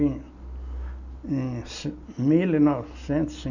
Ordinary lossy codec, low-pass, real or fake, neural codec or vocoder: none; 7.2 kHz; real; none